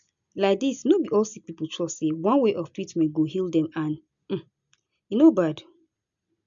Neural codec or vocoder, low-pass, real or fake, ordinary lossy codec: none; 7.2 kHz; real; MP3, 64 kbps